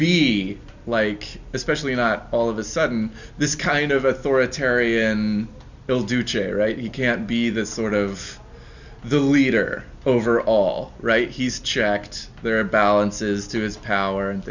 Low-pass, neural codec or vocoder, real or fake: 7.2 kHz; none; real